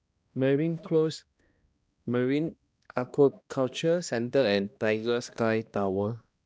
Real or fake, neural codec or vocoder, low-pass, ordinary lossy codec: fake; codec, 16 kHz, 1 kbps, X-Codec, HuBERT features, trained on balanced general audio; none; none